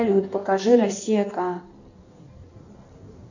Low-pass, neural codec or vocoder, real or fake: 7.2 kHz; codec, 16 kHz in and 24 kHz out, 1.1 kbps, FireRedTTS-2 codec; fake